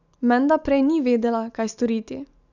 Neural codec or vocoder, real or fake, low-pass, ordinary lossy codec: none; real; 7.2 kHz; none